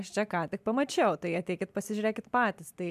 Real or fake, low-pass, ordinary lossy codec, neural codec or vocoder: real; 14.4 kHz; MP3, 96 kbps; none